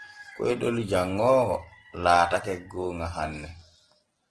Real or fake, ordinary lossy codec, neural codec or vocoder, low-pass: real; Opus, 16 kbps; none; 9.9 kHz